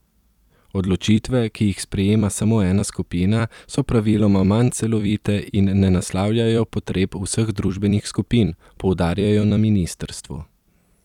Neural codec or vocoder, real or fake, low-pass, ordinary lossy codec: vocoder, 44.1 kHz, 128 mel bands every 256 samples, BigVGAN v2; fake; 19.8 kHz; none